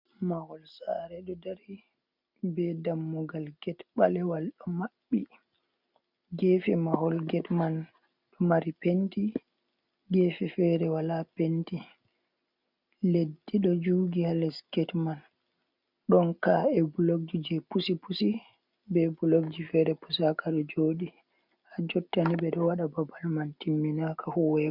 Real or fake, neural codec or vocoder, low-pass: real; none; 5.4 kHz